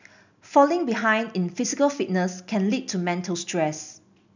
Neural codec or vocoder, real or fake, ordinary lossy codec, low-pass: none; real; none; 7.2 kHz